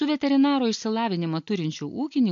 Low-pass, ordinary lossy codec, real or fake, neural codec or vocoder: 7.2 kHz; MP3, 48 kbps; real; none